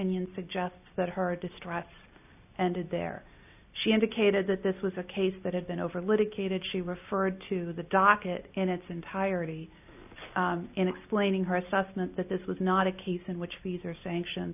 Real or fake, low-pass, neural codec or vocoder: real; 3.6 kHz; none